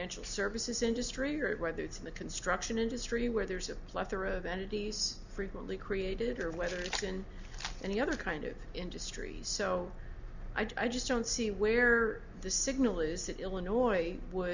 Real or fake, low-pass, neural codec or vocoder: real; 7.2 kHz; none